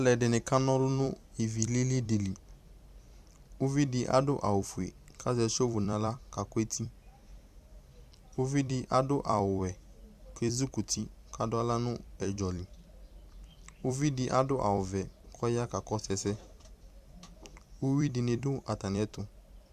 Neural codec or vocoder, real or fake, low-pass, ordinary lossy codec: vocoder, 44.1 kHz, 128 mel bands every 256 samples, BigVGAN v2; fake; 14.4 kHz; Opus, 64 kbps